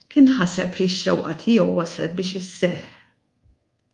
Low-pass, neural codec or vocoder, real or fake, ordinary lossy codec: 10.8 kHz; codec, 24 kHz, 1.2 kbps, DualCodec; fake; Opus, 32 kbps